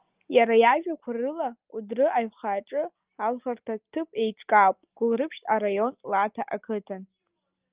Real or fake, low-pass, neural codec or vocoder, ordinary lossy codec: real; 3.6 kHz; none; Opus, 24 kbps